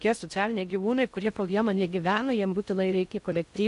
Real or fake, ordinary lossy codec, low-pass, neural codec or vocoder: fake; MP3, 96 kbps; 10.8 kHz; codec, 16 kHz in and 24 kHz out, 0.6 kbps, FocalCodec, streaming, 4096 codes